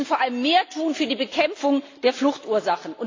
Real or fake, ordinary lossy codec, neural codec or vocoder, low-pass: real; AAC, 32 kbps; none; 7.2 kHz